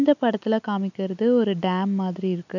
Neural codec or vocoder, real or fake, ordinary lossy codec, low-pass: none; real; none; 7.2 kHz